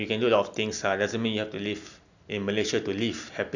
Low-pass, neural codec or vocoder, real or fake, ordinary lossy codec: 7.2 kHz; none; real; none